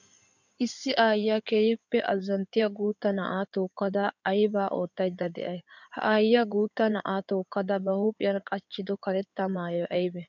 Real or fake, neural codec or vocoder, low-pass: fake; codec, 16 kHz in and 24 kHz out, 2.2 kbps, FireRedTTS-2 codec; 7.2 kHz